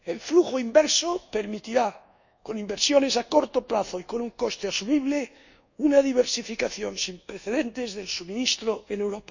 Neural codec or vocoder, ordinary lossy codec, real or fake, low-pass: codec, 24 kHz, 1.2 kbps, DualCodec; none; fake; 7.2 kHz